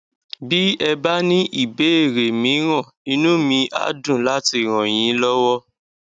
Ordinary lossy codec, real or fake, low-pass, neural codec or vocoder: none; real; 9.9 kHz; none